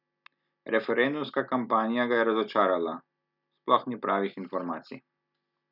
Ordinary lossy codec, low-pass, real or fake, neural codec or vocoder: none; 5.4 kHz; real; none